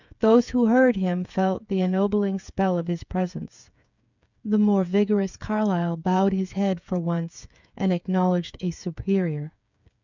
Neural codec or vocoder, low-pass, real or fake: codec, 16 kHz, 8 kbps, FreqCodec, smaller model; 7.2 kHz; fake